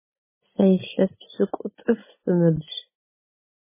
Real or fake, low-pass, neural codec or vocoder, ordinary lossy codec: real; 3.6 kHz; none; MP3, 16 kbps